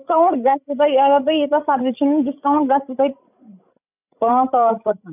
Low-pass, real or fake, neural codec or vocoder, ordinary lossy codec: 3.6 kHz; fake; codec, 16 kHz, 16 kbps, FreqCodec, larger model; none